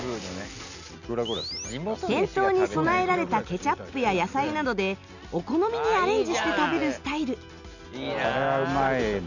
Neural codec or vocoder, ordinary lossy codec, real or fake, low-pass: none; none; real; 7.2 kHz